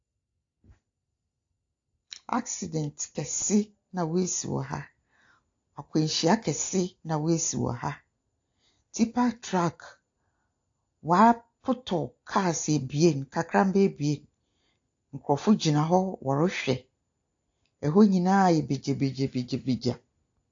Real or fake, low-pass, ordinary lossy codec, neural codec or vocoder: real; 7.2 kHz; AAC, 48 kbps; none